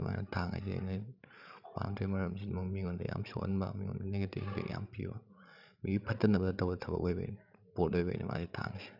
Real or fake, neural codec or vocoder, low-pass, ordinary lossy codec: fake; codec, 16 kHz, 16 kbps, FreqCodec, larger model; 5.4 kHz; none